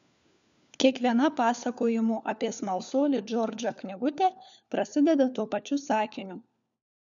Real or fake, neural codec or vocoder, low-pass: fake; codec, 16 kHz, 4 kbps, FunCodec, trained on LibriTTS, 50 frames a second; 7.2 kHz